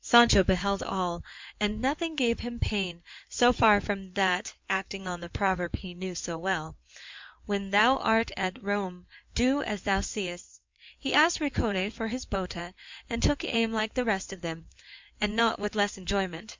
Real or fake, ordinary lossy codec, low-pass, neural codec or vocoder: fake; MP3, 64 kbps; 7.2 kHz; codec, 16 kHz in and 24 kHz out, 2.2 kbps, FireRedTTS-2 codec